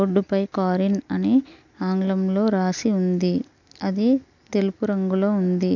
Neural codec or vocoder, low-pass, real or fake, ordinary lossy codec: none; 7.2 kHz; real; none